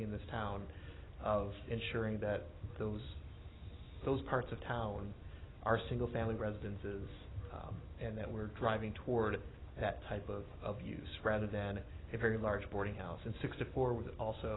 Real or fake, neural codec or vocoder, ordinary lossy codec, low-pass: real; none; AAC, 16 kbps; 7.2 kHz